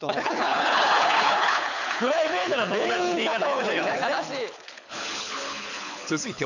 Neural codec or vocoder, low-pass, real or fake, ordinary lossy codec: codec, 24 kHz, 6 kbps, HILCodec; 7.2 kHz; fake; none